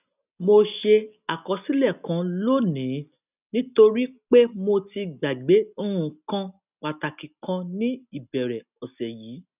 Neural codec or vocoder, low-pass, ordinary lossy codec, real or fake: none; 3.6 kHz; none; real